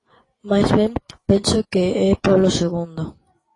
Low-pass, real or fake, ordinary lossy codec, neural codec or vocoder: 10.8 kHz; fake; AAC, 32 kbps; vocoder, 24 kHz, 100 mel bands, Vocos